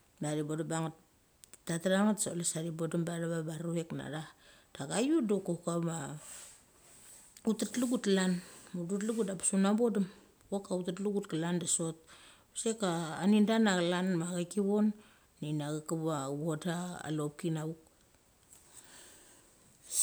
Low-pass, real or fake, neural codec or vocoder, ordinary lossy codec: none; real; none; none